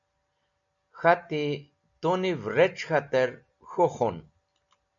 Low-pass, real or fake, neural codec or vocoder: 7.2 kHz; real; none